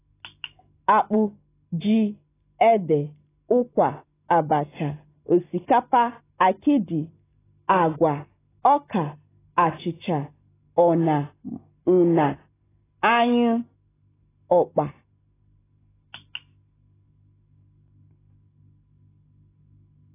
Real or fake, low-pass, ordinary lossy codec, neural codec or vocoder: real; 3.6 kHz; AAC, 16 kbps; none